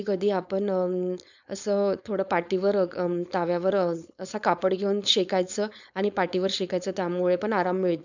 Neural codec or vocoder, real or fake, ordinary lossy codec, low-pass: codec, 16 kHz, 4.8 kbps, FACodec; fake; none; 7.2 kHz